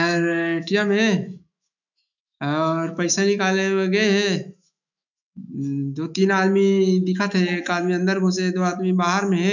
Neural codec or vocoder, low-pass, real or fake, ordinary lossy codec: autoencoder, 48 kHz, 128 numbers a frame, DAC-VAE, trained on Japanese speech; 7.2 kHz; fake; none